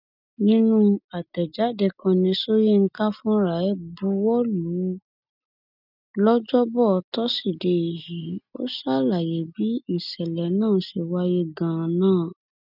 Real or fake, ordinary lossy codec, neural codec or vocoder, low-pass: real; none; none; 5.4 kHz